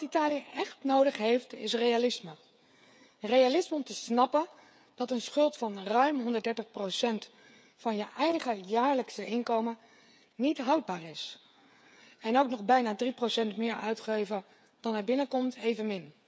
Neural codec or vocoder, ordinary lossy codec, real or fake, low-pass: codec, 16 kHz, 8 kbps, FreqCodec, smaller model; none; fake; none